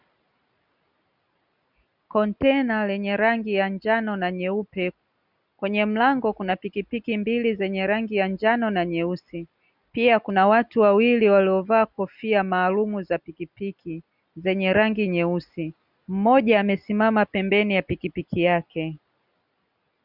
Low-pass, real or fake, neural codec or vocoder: 5.4 kHz; real; none